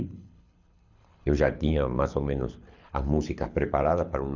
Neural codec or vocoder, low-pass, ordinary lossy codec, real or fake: codec, 24 kHz, 6 kbps, HILCodec; 7.2 kHz; none; fake